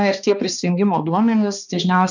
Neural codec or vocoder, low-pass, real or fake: codec, 16 kHz, 2 kbps, X-Codec, HuBERT features, trained on balanced general audio; 7.2 kHz; fake